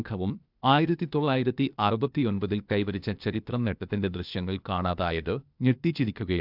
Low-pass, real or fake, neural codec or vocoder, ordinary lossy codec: 5.4 kHz; fake; codec, 16 kHz, 0.8 kbps, ZipCodec; none